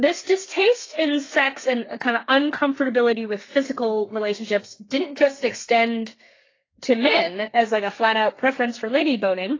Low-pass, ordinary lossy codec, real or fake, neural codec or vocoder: 7.2 kHz; AAC, 32 kbps; fake; codec, 32 kHz, 1.9 kbps, SNAC